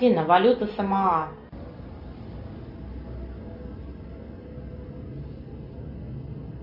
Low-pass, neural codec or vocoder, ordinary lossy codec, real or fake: 5.4 kHz; none; Opus, 64 kbps; real